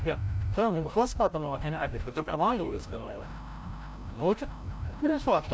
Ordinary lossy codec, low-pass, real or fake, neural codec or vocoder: none; none; fake; codec, 16 kHz, 0.5 kbps, FreqCodec, larger model